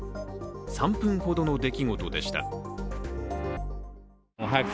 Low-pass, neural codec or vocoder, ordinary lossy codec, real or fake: none; none; none; real